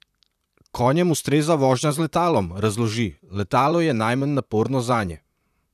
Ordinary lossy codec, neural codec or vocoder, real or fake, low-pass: none; vocoder, 44.1 kHz, 128 mel bands, Pupu-Vocoder; fake; 14.4 kHz